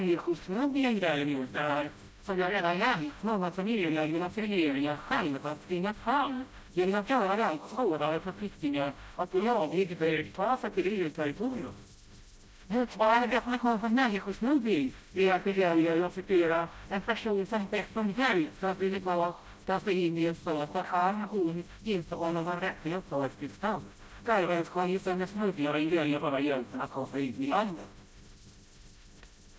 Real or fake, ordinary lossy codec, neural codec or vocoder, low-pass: fake; none; codec, 16 kHz, 0.5 kbps, FreqCodec, smaller model; none